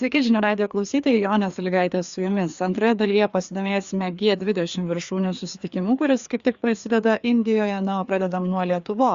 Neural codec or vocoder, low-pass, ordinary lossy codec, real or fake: codec, 16 kHz, 2 kbps, FreqCodec, larger model; 7.2 kHz; Opus, 64 kbps; fake